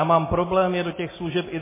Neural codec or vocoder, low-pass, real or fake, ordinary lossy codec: none; 3.6 kHz; real; MP3, 16 kbps